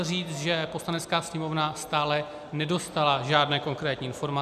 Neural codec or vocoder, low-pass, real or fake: none; 14.4 kHz; real